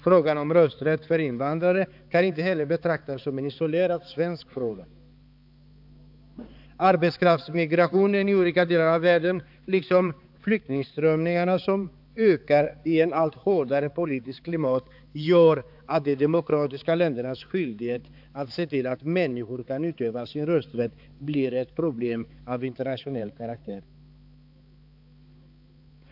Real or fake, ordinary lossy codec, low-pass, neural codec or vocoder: fake; AAC, 48 kbps; 5.4 kHz; codec, 16 kHz, 4 kbps, X-Codec, HuBERT features, trained on balanced general audio